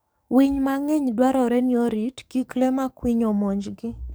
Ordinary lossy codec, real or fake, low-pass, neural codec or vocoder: none; fake; none; codec, 44.1 kHz, 7.8 kbps, DAC